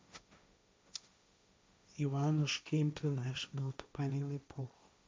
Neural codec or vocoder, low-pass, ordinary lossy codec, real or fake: codec, 16 kHz, 1.1 kbps, Voila-Tokenizer; none; none; fake